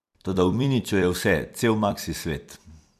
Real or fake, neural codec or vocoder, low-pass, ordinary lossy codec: fake; vocoder, 44.1 kHz, 128 mel bands every 256 samples, BigVGAN v2; 14.4 kHz; MP3, 96 kbps